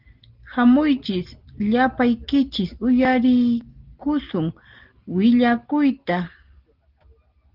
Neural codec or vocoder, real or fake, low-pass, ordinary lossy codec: none; real; 5.4 kHz; Opus, 16 kbps